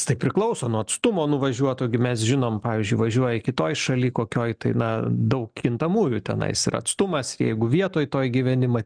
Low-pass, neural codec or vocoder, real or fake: 9.9 kHz; none; real